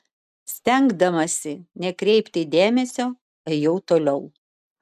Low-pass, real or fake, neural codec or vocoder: 14.4 kHz; real; none